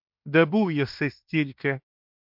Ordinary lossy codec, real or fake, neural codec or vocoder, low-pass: MP3, 48 kbps; fake; codec, 16 kHz in and 24 kHz out, 0.9 kbps, LongCat-Audio-Codec, fine tuned four codebook decoder; 5.4 kHz